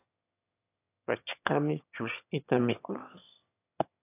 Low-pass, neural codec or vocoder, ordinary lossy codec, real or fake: 3.6 kHz; autoencoder, 22.05 kHz, a latent of 192 numbers a frame, VITS, trained on one speaker; AAC, 32 kbps; fake